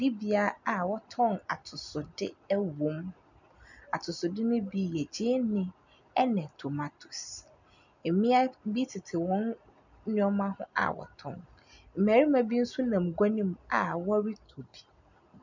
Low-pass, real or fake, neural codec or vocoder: 7.2 kHz; real; none